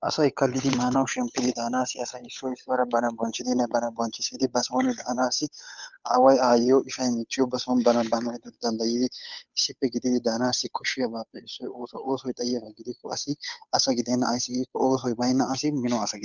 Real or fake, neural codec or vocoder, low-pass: fake; codec, 16 kHz, 8 kbps, FunCodec, trained on Chinese and English, 25 frames a second; 7.2 kHz